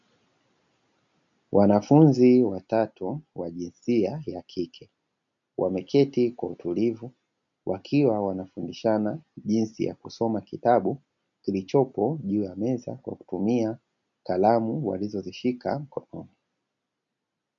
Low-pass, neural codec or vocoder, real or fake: 7.2 kHz; none; real